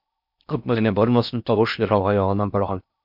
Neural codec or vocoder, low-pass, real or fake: codec, 16 kHz in and 24 kHz out, 0.6 kbps, FocalCodec, streaming, 4096 codes; 5.4 kHz; fake